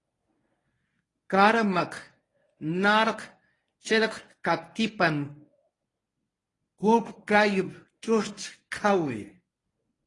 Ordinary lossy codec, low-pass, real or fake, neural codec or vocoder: AAC, 32 kbps; 10.8 kHz; fake; codec, 24 kHz, 0.9 kbps, WavTokenizer, medium speech release version 1